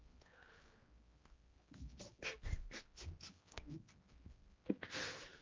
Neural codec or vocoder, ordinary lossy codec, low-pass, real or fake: codec, 16 kHz, 1 kbps, X-Codec, HuBERT features, trained on general audio; Opus, 24 kbps; 7.2 kHz; fake